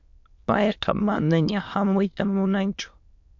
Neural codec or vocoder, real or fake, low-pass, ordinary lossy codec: autoencoder, 22.05 kHz, a latent of 192 numbers a frame, VITS, trained on many speakers; fake; 7.2 kHz; MP3, 48 kbps